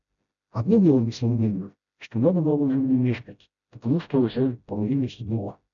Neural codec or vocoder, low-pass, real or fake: codec, 16 kHz, 0.5 kbps, FreqCodec, smaller model; 7.2 kHz; fake